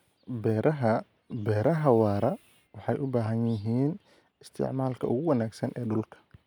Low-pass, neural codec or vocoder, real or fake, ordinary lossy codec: 19.8 kHz; none; real; none